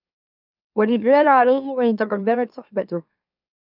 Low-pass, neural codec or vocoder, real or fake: 5.4 kHz; autoencoder, 44.1 kHz, a latent of 192 numbers a frame, MeloTTS; fake